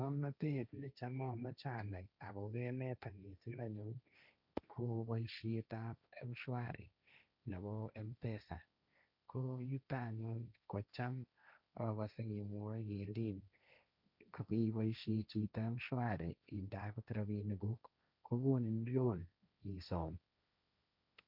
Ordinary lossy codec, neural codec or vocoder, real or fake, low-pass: none; codec, 16 kHz, 1.1 kbps, Voila-Tokenizer; fake; 5.4 kHz